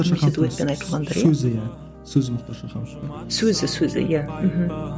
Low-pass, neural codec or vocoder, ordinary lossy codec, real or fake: none; none; none; real